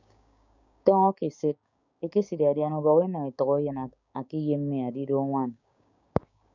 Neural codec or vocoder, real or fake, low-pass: codec, 16 kHz, 6 kbps, DAC; fake; 7.2 kHz